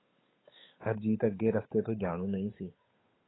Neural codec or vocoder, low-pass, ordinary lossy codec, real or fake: codec, 16 kHz, 8 kbps, FunCodec, trained on Chinese and English, 25 frames a second; 7.2 kHz; AAC, 16 kbps; fake